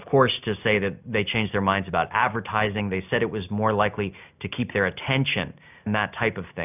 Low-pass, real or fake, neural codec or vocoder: 3.6 kHz; real; none